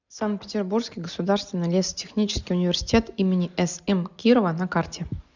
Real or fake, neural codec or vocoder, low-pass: real; none; 7.2 kHz